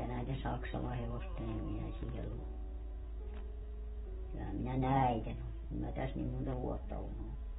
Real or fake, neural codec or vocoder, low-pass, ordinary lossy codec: fake; vocoder, 48 kHz, 128 mel bands, Vocos; 19.8 kHz; AAC, 16 kbps